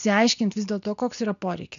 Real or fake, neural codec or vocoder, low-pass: real; none; 7.2 kHz